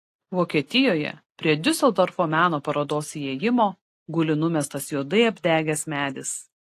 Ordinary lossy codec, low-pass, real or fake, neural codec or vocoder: AAC, 48 kbps; 14.4 kHz; real; none